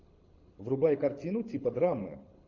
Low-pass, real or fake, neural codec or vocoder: 7.2 kHz; fake; codec, 24 kHz, 6 kbps, HILCodec